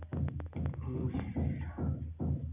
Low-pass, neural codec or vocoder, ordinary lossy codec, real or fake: 3.6 kHz; none; none; real